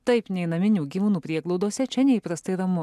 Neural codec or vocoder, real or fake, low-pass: none; real; 14.4 kHz